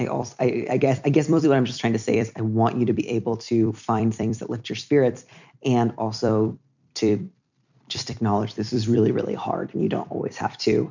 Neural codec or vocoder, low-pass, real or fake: vocoder, 44.1 kHz, 128 mel bands every 256 samples, BigVGAN v2; 7.2 kHz; fake